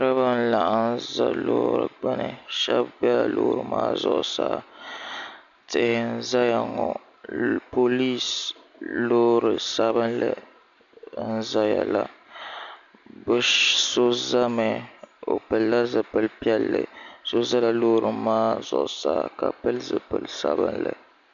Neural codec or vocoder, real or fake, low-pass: none; real; 7.2 kHz